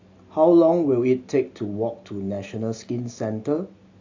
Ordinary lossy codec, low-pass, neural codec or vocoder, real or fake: AAC, 48 kbps; 7.2 kHz; none; real